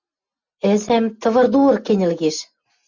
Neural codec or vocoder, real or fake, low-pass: none; real; 7.2 kHz